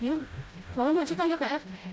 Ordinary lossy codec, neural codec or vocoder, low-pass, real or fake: none; codec, 16 kHz, 0.5 kbps, FreqCodec, smaller model; none; fake